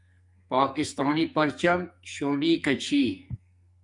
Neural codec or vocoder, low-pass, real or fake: codec, 44.1 kHz, 2.6 kbps, SNAC; 10.8 kHz; fake